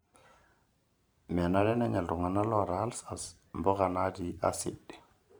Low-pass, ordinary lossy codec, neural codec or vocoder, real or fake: none; none; vocoder, 44.1 kHz, 128 mel bands every 512 samples, BigVGAN v2; fake